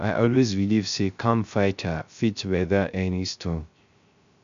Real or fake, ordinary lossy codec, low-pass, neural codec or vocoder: fake; MP3, 64 kbps; 7.2 kHz; codec, 16 kHz, 0.3 kbps, FocalCodec